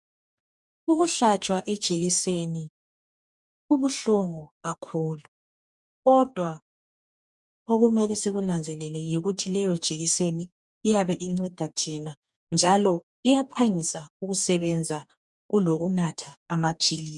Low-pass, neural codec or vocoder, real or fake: 10.8 kHz; codec, 44.1 kHz, 2.6 kbps, DAC; fake